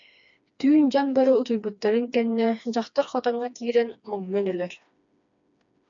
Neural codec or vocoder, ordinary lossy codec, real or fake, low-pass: codec, 16 kHz, 2 kbps, FreqCodec, smaller model; MP3, 64 kbps; fake; 7.2 kHz